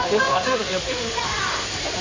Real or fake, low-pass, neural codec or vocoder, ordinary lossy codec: fake; 7.2 kHz; codec, 16 kHz in and 24 kHz out, 2.2 kbps, FireRedTTS-2 codec; MP3, 64 kbps